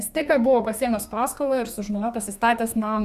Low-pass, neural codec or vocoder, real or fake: 14.4 kHz; codec, 32 kHz, 1.9 kbps, SNAC; fake